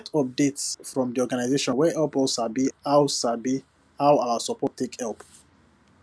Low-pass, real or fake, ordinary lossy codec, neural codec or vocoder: none; real; none; none